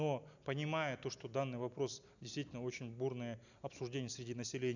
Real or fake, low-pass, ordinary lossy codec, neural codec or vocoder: real; 7.2 kHz; none; none